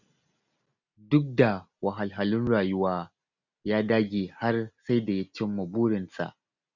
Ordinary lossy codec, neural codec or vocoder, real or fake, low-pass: none; none; real; 7.2 kHz